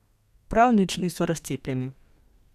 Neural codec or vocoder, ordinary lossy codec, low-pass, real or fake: codec, 32 kHz, 1.9 kbps, SNAC; none; 14.4 kHz; fake